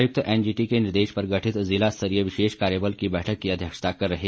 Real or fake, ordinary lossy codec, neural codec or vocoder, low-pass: real; none; none; none